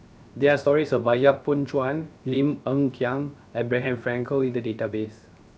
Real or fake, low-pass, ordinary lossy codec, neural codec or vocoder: fake; none; none; codec, 16 kHz, 0.7 kbps, FocalCodec